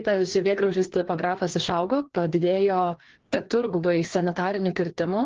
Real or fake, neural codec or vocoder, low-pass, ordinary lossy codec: fake; codec, 16 kHz, 2 kbps, FreqCodec, larger model; 7.2 kHz; Opus, 16 kbps